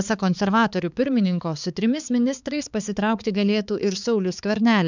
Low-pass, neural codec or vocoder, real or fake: 7.2 kHz; codec, 16 kHz, 4 kbps, X-Codec, HuBERT features, trained on balanced general audio; fake